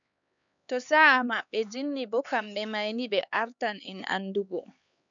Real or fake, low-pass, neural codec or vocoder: fake; 7.2 kHz; codec, 16 kHz, 4 kbps, X-Codec, HuBERT features, trained on LibriSpeech